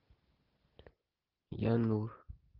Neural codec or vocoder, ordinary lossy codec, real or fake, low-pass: none; Opus, 32 kbps; real; 5.4 kHz